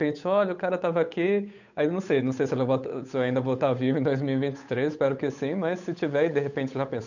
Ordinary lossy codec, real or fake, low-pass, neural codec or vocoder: none; fake; 7.2 kHz; codec, 16 kHz, 8 kbps, FunCodec, trained on Chinese and English, 25 frames a second